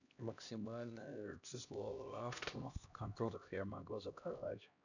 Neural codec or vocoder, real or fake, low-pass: codec, 16 kHz, 1 kbps, X-Codec, HuBERT features, trained on LibriSpeech; fake; 7.2 kHz